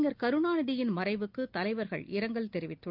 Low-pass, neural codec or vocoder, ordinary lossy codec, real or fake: 5.4 kHz; none; Opus, 32 kbps; real